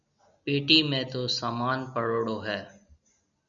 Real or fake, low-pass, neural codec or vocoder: real; 7.2 kHz; none